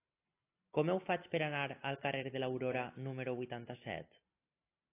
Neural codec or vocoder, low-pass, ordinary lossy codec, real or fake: none; 3.6 kHz; AAC, 24 kbps; real